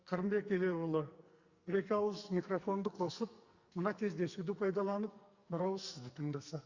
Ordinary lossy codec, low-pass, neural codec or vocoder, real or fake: Opus, 64 kbps; 7.2 kHz; codec, 44.1 kHz, 2.6 kbps, SNAC; fake